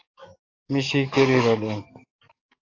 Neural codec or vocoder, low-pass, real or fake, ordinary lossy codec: codec, 44.1 kHz, 7.8 kbps, DAC; 7.2 kHz; fake; AAC, 32 kbps